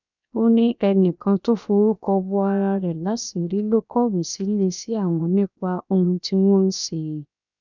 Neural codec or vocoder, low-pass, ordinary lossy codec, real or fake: codec, 16 kHz, 0.7 kbps, FocalCodec; 7.2 kHz; none; fake